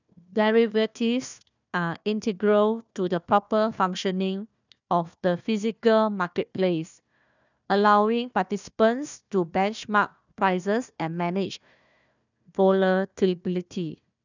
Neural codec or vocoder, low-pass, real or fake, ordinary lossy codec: codec, 16 kHz, 1 kbps, FunCodec, trained on Chinese and English, 50 frames a second; 7.2 kHz; fake; none